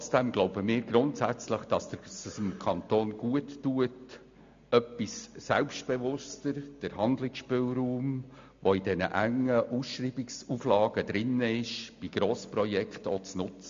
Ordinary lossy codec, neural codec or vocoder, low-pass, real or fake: MP3, 48 kbps; none; 7.2 kHz; real